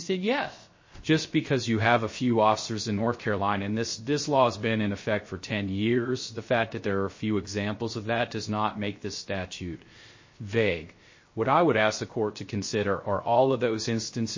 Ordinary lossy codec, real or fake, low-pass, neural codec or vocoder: MP3, 32 kbps; fake; 7.2 kHz; codec, 16 kHz, 0.3 kbps, FocalCodec